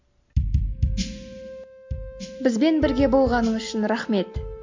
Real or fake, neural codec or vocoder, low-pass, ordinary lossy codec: real; none; 7.2 kHz; MP3, 48 kbps